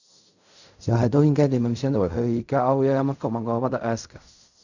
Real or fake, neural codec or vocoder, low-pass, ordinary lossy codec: fake; codec, 16 kHz in and 24 kHz out, 0.4 kbps, LongCat-Audio-Codec, fine tuned four codebook decoder; 7.2 kHz; none